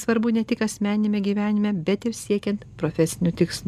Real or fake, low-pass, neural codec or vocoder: real; 14.4 kHz; none